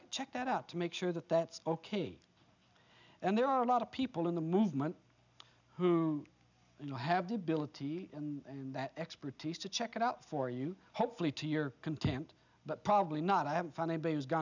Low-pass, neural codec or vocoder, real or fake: 7.2 kHz; none; real